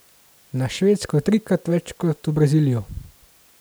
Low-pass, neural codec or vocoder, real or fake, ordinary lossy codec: none; none; real; none